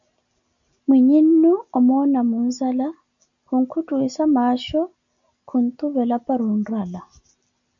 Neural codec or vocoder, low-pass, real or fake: none; 7.2 kHz; real